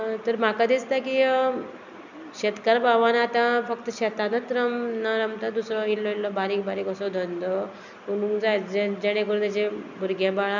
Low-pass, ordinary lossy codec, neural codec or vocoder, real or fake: 7.2 kHz; none; none; real